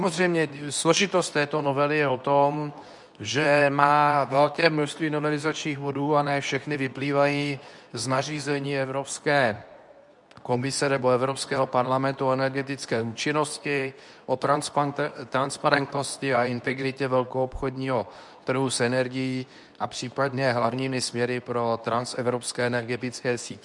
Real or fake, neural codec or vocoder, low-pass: fake; codec, 24 kHz, 0.9 kbps, WavTokenizer, medium speech release version 2; 10.8 kHz